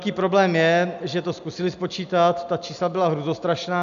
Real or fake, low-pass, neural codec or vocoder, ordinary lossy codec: real; 7.2 kHz; none; AAC, 96 kbps